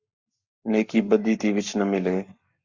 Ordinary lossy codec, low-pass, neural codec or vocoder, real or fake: Opus, 64 kbps; 7.2 kHz; codec, 44.1 kHz, 7.8 kbps, Pupu-Codec; fake